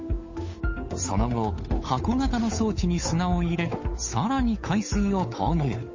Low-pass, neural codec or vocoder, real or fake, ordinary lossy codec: 7.2 kHz; codec, 16 kHz, 8 kbps, FunCodec, trained on Chinese and English, 25 frames a second; fake; MP3, 32 kbps